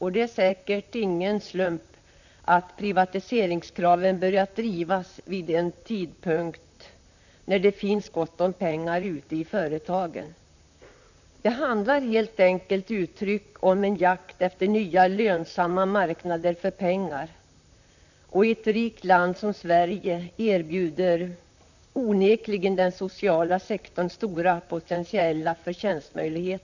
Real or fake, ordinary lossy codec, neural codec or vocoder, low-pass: fake; none; vocoder, 44.1 kHz, 128 mel bands, Pupu-Vocoder; 7.2 kHz